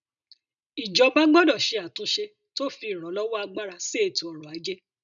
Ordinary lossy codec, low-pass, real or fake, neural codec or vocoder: none; 7.2 kHz; real; none